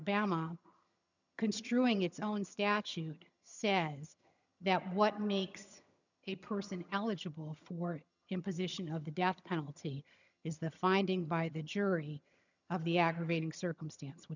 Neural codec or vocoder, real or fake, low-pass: vocoder, 22.05 kHz, 80 mel bands, HiFi-GAN; fake; 7.2 kHz